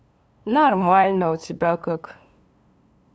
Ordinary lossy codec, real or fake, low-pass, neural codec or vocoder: none; fake; none; codec, 16 kHz, 2 kbps, FunCodec, trained on LibriTTS, 25 frames a second